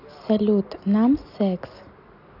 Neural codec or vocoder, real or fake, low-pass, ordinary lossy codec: none; real; 5.4 kHz; none